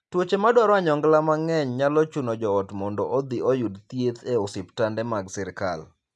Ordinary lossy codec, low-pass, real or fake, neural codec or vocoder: none; none; real; none